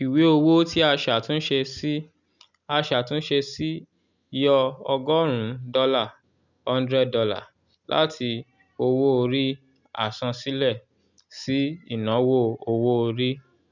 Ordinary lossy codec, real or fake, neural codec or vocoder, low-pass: none; real; none; 7.2 kHz